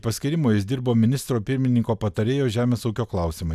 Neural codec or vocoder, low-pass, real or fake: none; 14.4 kHz; real